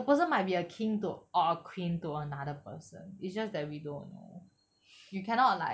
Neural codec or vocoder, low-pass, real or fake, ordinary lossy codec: none; none; real; none